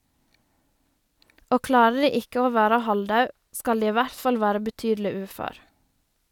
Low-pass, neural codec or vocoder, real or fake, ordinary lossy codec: 19.8 kHz; none; real; none